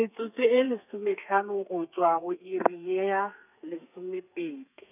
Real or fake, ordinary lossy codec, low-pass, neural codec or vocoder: fake; none; 3.6 kHz; codec, 32 kHz, 1.9 kbps, SNAC